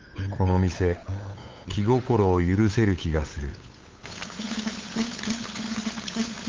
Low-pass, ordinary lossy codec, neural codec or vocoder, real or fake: 7.2 kHz; Opus, 32 kbps; codec, 16 kHz, 8 kbps, FunCodec, trained on LibriTTS, 25 frames a second; fake